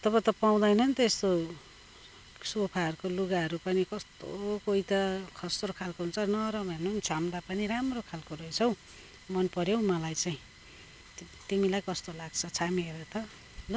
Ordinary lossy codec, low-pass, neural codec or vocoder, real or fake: none; none; none; real